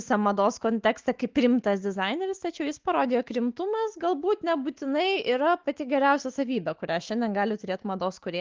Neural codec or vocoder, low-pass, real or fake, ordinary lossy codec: autoencoder, 48 kHz, 128 numbers a frame, DAC-VAE, trained on Japanese speech; 7.2 kHz; fake; Opus, 16 kbps